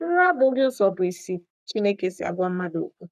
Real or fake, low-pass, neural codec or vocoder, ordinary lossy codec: fake; 14.4 kHz; codec, 44.1 kHz, 3.4 kbps, Pupu-Codec; none